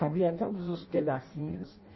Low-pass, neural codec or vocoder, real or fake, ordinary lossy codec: 7.2 kHz; codec, 16 kHz in and 24 kHz out, 0.6 kbps, FireRedTTS-2 codec; fake; MP3, 24 kbps